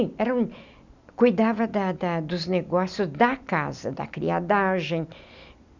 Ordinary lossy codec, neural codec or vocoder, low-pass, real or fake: none; none; 7.2 kHz; real